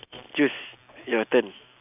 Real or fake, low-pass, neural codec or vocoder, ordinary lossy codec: real; 3.6 kHz; none; none